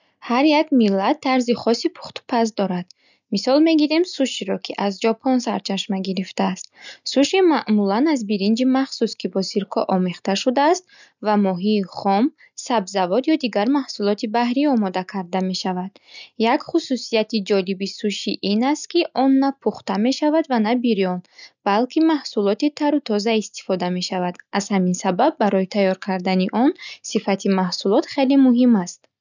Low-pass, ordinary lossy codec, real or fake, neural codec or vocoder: 7.2 kHz; none; real; none